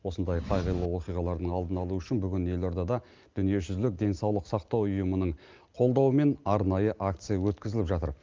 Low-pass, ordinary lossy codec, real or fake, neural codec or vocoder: 7.2 kHz; Opus, 32 kbps; real; none